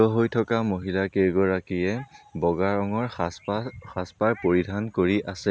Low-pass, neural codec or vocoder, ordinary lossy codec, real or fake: none; none; none; real